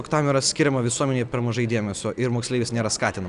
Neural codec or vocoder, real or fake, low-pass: none; real; 10.8 kHz